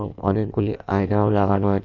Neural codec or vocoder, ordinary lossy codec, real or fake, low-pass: codec, 16 kHz in and 24 kHz out, 1.1 kbps, FireRedTTS-2 codec; none; fake; 7.2 kHz